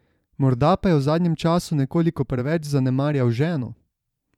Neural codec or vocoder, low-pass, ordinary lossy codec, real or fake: vocoder, 44.1 kHz, 128 mel bands every 512 samples, BigVGAN v2; 19.8 kHz; none; fake